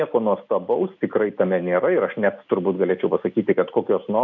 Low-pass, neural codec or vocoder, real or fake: 7.2 kHz; none; real